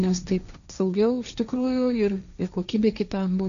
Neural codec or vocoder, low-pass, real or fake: codec, 16 kHz, 1.1 kbps, Voila-Tokenizer; 7.2 kHz; fake